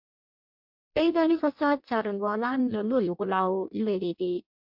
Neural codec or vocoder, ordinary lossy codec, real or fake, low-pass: codec, 16 kHz in and 24 kHz out, 0.6 kbps, FireRedTTS-2 codec; MP3, 48 kbps; fake; 5.4 kHz